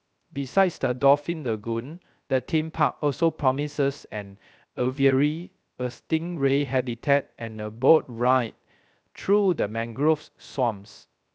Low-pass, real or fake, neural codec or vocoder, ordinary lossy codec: none; fake; codec, 16 kHz, 0.3 kbps, FocalCodec; none